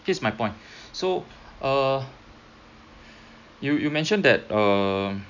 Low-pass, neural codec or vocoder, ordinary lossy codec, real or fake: 7.2 kHz; none; none; real